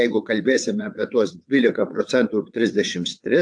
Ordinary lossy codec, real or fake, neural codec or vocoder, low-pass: AAC, 64 kbps; fake; vocoder, 22.05 kHz, 80 mel bands, WaveNeXt; 9.9 kHz